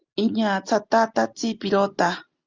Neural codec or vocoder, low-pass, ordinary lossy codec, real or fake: none; 7.2 kHz; Opus, 32 kbps; real